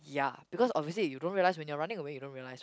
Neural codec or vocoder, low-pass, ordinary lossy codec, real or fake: none; none; none; real